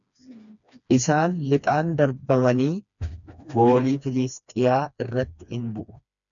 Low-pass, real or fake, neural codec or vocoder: 7.2 kHz; fake; codec, 16 kHz, 2 kbps, FreqCodec, smaller model